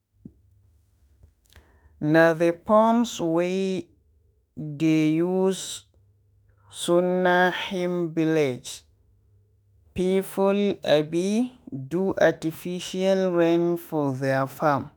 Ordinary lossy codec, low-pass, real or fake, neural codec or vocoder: none; none; fake; autoencoder, 48 kHz, 32 numbers a frame, DAC-VAE, trained on Japanese speech